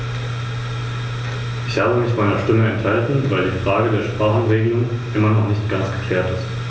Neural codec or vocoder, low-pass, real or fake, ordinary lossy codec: none; none; real; none